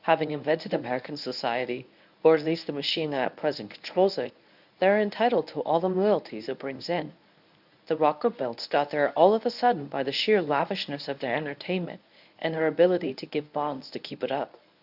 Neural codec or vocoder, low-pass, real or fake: codec, 24 kHz, 0.9 kbps, WavTokenizer, medium speech release version 1; 5.4 kHz; fake